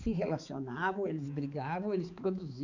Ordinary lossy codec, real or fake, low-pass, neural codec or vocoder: none; fake; 7.2 kHz; codec, 16 kHz, 4 kbps, X-Codec, HuBERT features, trained on balanced general audio